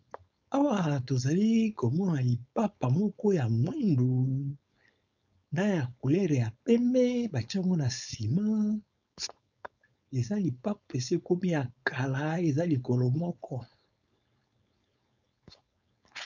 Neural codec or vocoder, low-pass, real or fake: codec, 16 kHz, 4.8 kbps, FACodec; 7.2 kHz; fake